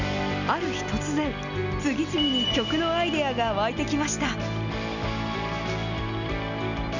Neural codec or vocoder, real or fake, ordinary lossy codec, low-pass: none; real; none; 7.2 kHz